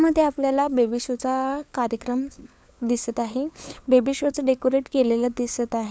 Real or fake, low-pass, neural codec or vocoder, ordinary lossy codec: fake; none; codec, 16 kHz, 4 kbps, FunCodec, trained on LibriTTS, 50 frames a second; none